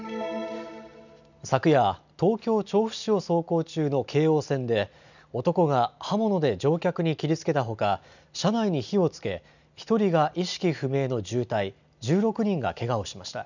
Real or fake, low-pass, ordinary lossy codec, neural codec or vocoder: real; 7.2 kHz; none; none